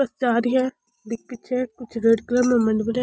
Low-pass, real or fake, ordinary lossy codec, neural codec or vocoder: none; real; none; none